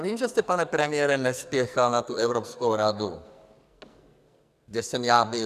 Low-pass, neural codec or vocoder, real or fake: 14.4 kHz; codec, 44.1 kHz, 2.6 kbps, SNAC; fake